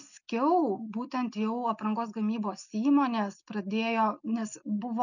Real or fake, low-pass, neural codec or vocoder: real; 7.2 kHz; none